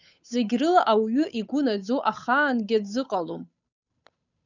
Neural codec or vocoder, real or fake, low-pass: codec, 16 kHz, 8 kbps, FunCodec, trained on Chinese and English, 25 frames a second; fake; 7.2 kHz